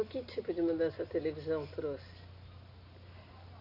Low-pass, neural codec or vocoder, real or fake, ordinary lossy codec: 5.4 kHz; none; real; none